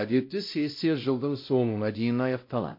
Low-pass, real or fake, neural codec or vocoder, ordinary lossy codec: 5.4 kHz; fake; codec, 16 kHz, 0.5 kbps, X-Codec, WavLM features, trained on Multilingual LibriSpeech; MP3, 32 kbps